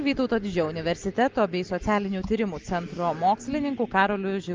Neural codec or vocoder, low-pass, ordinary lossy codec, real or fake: none; 7.2 kHz; Opus, 32 kbps; real